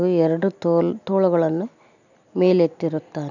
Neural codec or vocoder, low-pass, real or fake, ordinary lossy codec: none; 7.2 kHz; real; none